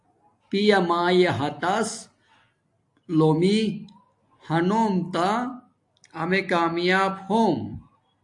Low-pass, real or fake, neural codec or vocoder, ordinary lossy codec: 10.8 kHz; real; none; AAC, 64 kbps